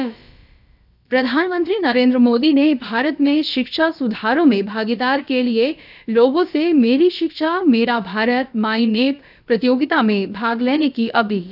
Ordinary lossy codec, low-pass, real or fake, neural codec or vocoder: none; 5.4 kHz; fake; codec, 16 kHz, about 1 kbps, DyCAST, with the encoder's durations